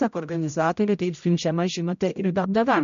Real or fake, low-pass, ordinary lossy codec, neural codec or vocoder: fake; 7.2 kHz; MP3, 64 kbps; codec, 16 kHz, 0.5 kbps, X-Codec, HuBERT features, trained on general audio